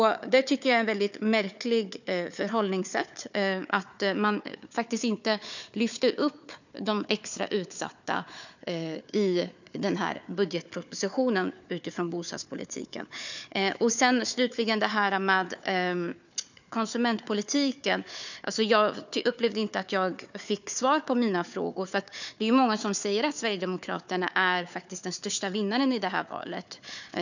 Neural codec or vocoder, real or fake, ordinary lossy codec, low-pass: codec, 16 kHz, 4 kbps, FunCodec, trained on Chinese and English, 50 frames a second; fake; none; 7.2 kHz